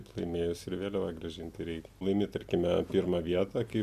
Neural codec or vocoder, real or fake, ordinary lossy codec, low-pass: none; real; MP3, 96 kbps; 14.4 kHz